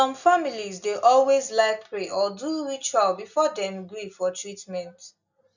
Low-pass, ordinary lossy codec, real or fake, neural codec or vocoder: 7.2 kHz; none; real; none